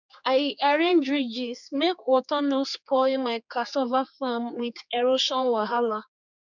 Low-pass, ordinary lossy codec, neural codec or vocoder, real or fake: 7.2 kHz; none; codec, 16 kHz, 4 kbps, X-Codec, HuBERT features, trained on general audio; fake